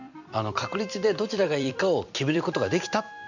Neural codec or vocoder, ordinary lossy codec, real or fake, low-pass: vocoder, 44.1 kHz, 128 mel bands every 256 samples, BigVGAN v2; none; fake; 7.2 kHz